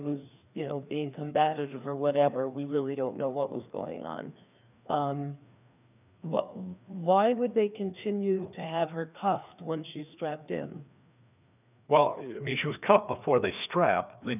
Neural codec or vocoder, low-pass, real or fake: codec, 16 kHz, 2 kbps, FreqCodec, larger model; 3.6 kHz; fake